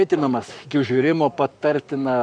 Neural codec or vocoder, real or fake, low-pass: codec, 44.1 kHz, 7.8 kbps, Pupu-Codec; fake; 9.9 kHz